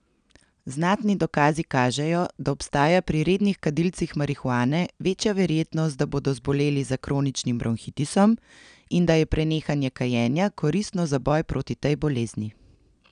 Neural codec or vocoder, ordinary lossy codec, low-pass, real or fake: none; none; 9.9 kHz; real